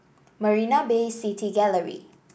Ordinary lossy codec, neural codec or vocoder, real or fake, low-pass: none; none; real; none